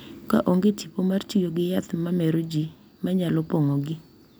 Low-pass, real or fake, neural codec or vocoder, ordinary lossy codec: none; real; none; none